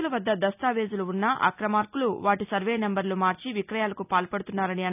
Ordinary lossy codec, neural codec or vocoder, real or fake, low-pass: none; none; real; 3.6 kHz